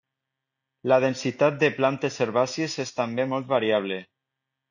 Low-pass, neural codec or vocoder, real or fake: 7.2 kHz; none; real